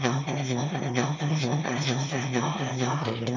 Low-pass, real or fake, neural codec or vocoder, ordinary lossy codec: 7.2 kHz; fake; autoencoder, 22.05 kHz, a latent of 192 numbers a frame, VITS, trained on one speaker; MP3, 64 kbps